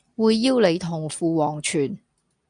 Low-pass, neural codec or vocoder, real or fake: 9.9 kHz; none; real